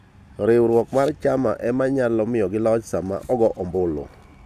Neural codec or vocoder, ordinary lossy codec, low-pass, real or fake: none; none; 14.4 kHz; real